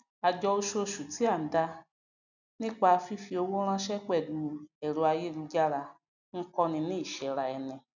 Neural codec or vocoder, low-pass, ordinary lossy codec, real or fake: none; 7.2 kHz; none; real